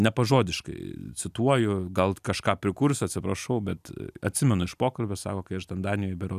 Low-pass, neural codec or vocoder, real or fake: 14.4 kHz; none; real